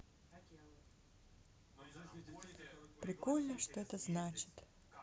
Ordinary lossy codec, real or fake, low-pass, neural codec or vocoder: none; real; none; none